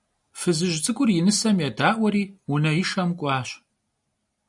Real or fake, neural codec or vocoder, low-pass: real; none; 10.8 kHz